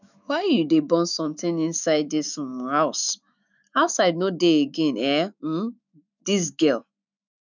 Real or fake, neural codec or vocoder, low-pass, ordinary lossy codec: fake; autoencoder, 48 kHz, 128 numbers a frame, DAC-VAE, trained on Japanese speech; 7.2 kHz; none